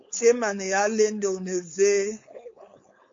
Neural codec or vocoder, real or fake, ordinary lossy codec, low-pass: codec, 16 kHz, 4.8 kbps, FACodec; fake; MP3, 48 kbps; 7.2 kHz